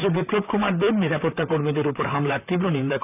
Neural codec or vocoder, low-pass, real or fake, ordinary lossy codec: vocoder, 44.1 kHz, 128 mel bands, Pupu-Vocoder; 3.6 kHz; fake; none